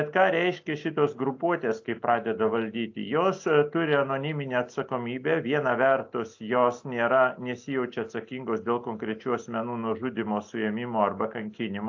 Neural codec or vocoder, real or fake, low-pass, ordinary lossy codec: none; real; 7.2 kHz; AAC, 48 kbps